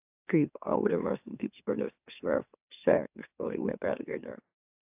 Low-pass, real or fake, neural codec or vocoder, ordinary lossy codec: 3.6 kHz; fake; autoencoder, 44.1 kHz, a latent of 192 numbers a frame, MeloTTS; AAC, 32 kbps